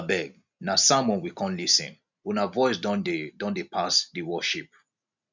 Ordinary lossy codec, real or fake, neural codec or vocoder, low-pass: none; real; none; 7.2 kHz